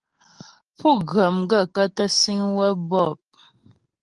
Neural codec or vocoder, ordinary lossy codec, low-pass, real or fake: codec, 44.1 kHz, 7.8 kbps, DAC; Opus, 24 kbps; 10.8 kHz; fake